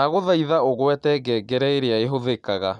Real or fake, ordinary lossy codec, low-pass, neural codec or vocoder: real; none; 10.8 kHz; none